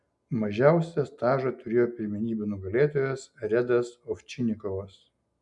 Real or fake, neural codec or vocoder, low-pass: real; none; 10.8 kHz